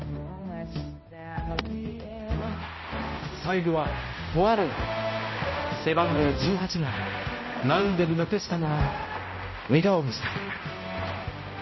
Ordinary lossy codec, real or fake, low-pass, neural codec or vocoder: MP3, 24 kbps; fake; 7.2 kHz; codec, 16 kHz, 0.5 kbps, X-Codec, HuBERT features, trained on balanced general audio